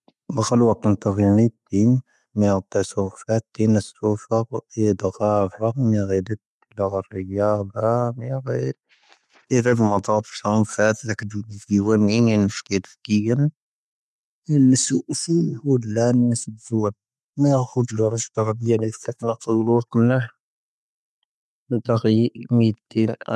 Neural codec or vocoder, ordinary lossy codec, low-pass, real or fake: none; none; none; real